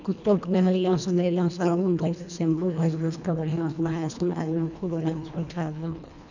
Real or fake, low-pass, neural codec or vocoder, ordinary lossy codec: fake; 7.2 kHz; codec, 24 kHz, 1.5 kbps, HILCodec; none